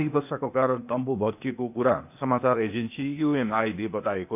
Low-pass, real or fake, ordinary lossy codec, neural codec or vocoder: 3.6 kHz; fake; none; codec, 16 kHz, 0.8 kbps, ZipCodec